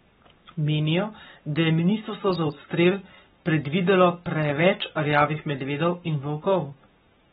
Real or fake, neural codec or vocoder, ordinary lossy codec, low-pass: fake; codec, 44.1 kHz, 7.8 kbps, Pupu-Codec; AAC, 16 kbps; 19.8 kHz